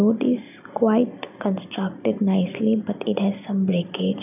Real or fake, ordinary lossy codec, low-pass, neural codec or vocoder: real; none; 3.6 kHz; none